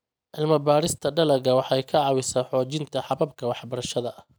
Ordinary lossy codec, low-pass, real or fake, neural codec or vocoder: none; none; real; none